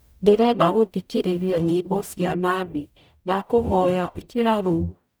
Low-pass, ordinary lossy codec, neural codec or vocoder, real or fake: none; none; codec, 44.1 kHz, 0.9 kbps, DAC; fake